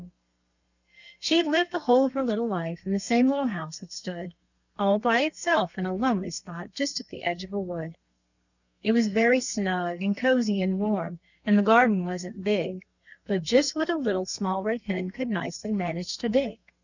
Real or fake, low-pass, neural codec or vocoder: fake; 7.2 kHz; codec, 32 kHz, 1.9 kbps, SNAC